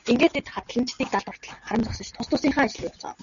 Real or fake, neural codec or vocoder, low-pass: real; none; 7.2 kHz